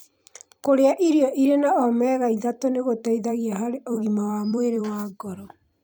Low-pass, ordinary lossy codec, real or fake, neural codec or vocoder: none; none; fake; vocoder, 44.1 kHz, 128 mel bands every 256 samples, BigVGAN v2